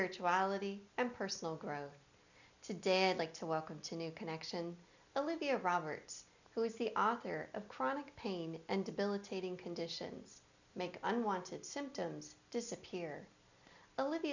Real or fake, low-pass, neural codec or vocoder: real; 7.2 kHz; none